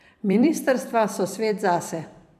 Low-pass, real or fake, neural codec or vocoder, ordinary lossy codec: 14.4 kHz; fake; vocoder, 44.1 kHz, 128 mel bands every 256 samples, BigVGAN v2; none